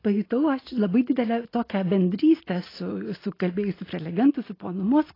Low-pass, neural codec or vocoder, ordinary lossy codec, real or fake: 5.4 kHz; none; AAC, 24 kbps; real